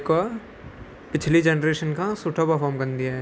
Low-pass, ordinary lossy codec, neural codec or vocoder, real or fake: none; none; none; real